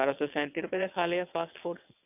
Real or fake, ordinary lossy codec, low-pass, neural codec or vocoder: fake; none; 3.6 kHz; vocoder, 22.05 kHz, 80 mel bands, WaveNeXt